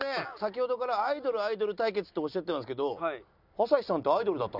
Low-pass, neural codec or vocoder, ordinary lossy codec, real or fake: 5.4 kHz; none; none; real